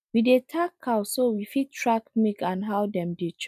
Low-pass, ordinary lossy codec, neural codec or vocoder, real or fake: 14.4 kHz; none; none; real